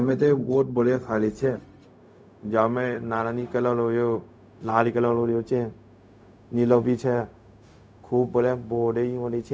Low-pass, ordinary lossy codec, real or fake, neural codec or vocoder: none; none; fake; codec, 16 kHz, 0.4 kbps, LongCat-Audio-Codec